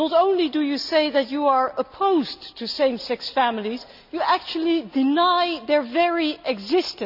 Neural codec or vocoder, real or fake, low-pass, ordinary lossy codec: none; real; 5.4 kHz; none